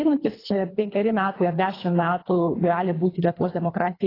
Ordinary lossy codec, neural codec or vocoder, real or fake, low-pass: AAC, 24 kbps; codec, 24 kHz, 3 kbps, HILCodec; fake; 5.4 kHz